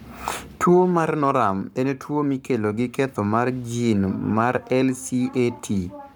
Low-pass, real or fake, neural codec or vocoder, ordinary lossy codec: none; fake; codec, 44.1 kHz, 7.8 kbps, Pupu-Codec; none